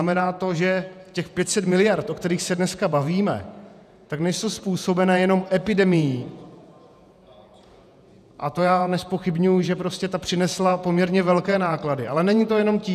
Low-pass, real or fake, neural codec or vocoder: 14.4 kHz; fake; vocoder, 48 kHz, 128 mel bands, Vocos